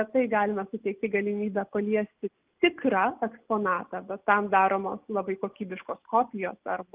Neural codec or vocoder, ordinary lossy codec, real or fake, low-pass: none; Opus, 32 kbps; real; 3.6 kHz